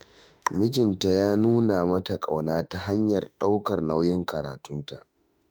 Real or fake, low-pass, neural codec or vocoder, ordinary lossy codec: fake; none; autoencoder, 48 kHz, 32 numbers a frame, DAC-VAE, trained on Japanese speech; none